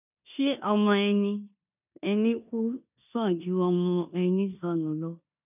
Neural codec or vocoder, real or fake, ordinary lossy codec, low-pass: codec, 16 kHz in and 24 kHz out, 0.9 kbps, LongCat-Audio-Codec, four codebook decoder; fake; none; 3.6 kHz